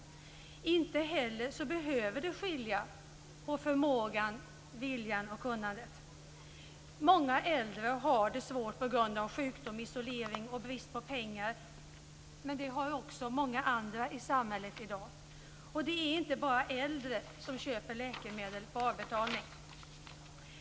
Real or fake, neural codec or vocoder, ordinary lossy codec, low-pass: real; none; none; none